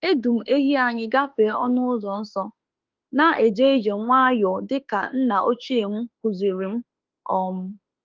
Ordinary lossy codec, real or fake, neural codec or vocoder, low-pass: Opus, 32 kbps; fake; autoencoder, 48 kHz, 32 numbers a frame, DAC-VAE, trained on Japanese speech; 7.2 kHz